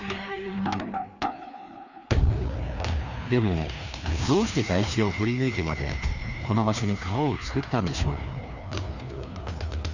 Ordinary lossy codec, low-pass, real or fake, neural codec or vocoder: none; 7.2 kHz; fake; codec, 16 kHz, 2 kbps, FreqCodec, larger model